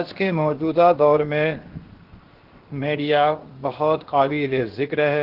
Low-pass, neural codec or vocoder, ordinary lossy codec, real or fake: 5.4 kHz; codec, 16 kHz, 0.7 kbps, FocalCodec; Opus, 16 kbps; fake